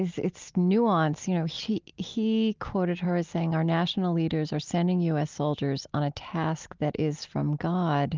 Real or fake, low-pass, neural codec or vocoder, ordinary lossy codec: real; 7.2 kHz; none; Opus, 32 kbps